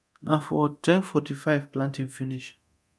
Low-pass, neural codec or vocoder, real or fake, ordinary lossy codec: none; codec, 24 kHz, 0.9 kbps, DualCodec; fake; none